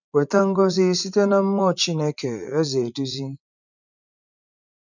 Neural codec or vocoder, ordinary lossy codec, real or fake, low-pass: vocoder, 44.1 kHz, 80 mel bands, Vocos; none; fake; 7.2 kHz